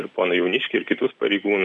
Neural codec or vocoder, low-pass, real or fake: none; 10.8 kHz; real